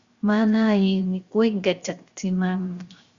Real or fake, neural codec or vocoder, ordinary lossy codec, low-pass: fake; codec, 16 kHz, 0.7 kbps, FocalCodec; Opus, 64 kbps; 7.2 kHz